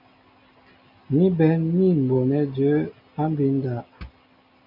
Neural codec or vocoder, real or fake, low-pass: none; real; 5.4 kHz